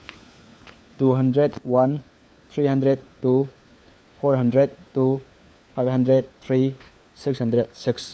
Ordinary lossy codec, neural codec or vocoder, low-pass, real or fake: none; codec, 16 kHz, 4 kbps, FunCodec, trained on LibriTTS, 50 frames a second; none; fake